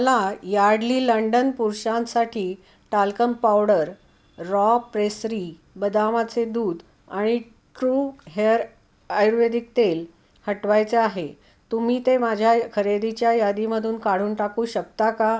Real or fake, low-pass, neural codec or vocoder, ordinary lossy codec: real; none; none; none